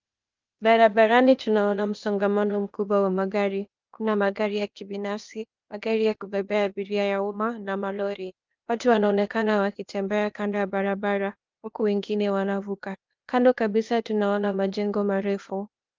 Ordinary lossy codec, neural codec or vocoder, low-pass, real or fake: Opus, 24 kbps; codec, 16 kHz, 0.8 kbps, ZipCodec; 7.2 kHz; fake